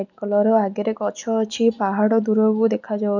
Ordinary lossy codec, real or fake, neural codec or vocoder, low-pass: none; real; none; 7.2 kHz